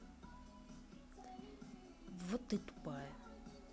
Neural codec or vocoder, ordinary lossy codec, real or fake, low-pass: none; none; real; none